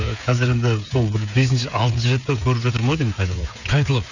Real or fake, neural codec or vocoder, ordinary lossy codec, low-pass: fake; codec, 16 kHz, 16 kbps, FreqCodec, smaller model; none; 7.2 kHz